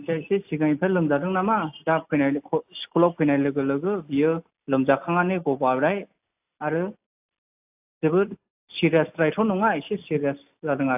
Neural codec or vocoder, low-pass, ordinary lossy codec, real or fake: none; 3.6 kHz; AAC, 32 kbps; real